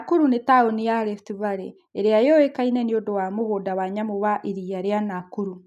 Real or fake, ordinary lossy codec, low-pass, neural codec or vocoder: real; none; 14.4 kHz; none